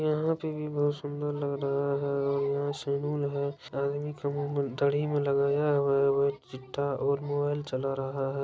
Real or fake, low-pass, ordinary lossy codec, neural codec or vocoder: real; none; none; none